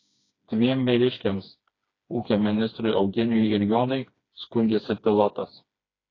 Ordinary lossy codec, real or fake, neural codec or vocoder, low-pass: AAC, 32 kbps; fake; codec, 16 kHz, 2 kbps, FreqCodec, smaller model; 7.2 kHz